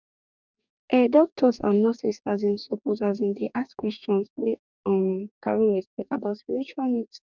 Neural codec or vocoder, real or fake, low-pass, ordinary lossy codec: codec, 44.1 kHz, 2.6 kbps, DAC; fake; 7.2 kHz; none